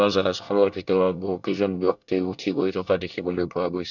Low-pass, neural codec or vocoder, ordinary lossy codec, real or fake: 7.2 kHz; codec, 24 kHz, 1 kbps, SNAC; none; fake